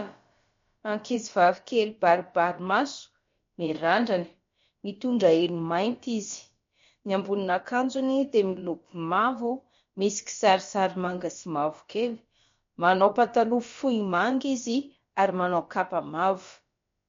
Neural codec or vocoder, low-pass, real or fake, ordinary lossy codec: codec, 16 kHz, about 1 kbps, DyCAST, with the encoder's durations; 7.2 kHz; fake; MP3, 48 kbps